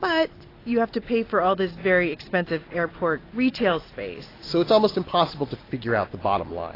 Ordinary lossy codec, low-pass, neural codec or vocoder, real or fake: AAC, 24 kbps; 5.4 kHz; none; real